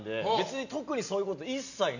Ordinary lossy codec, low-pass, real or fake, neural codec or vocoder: none; 7.2 kHz; real; none